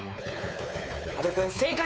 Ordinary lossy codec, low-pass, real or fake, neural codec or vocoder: none; none; fake; codec, 16 kHz, 4 kbps, X-Codec, WavLM features, trained on Multilingual LibriSpeech